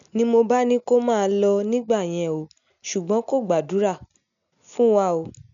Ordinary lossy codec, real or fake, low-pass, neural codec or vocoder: none; real; 7.2 kHz; none